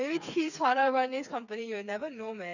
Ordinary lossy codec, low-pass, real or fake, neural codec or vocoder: none; 7.2 kHz; fake; codec, 16 kHz, 4 kbps, FreqCodec, smaller model